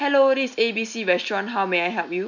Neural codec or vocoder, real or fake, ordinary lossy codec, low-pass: none; real; none; 7.2 kHz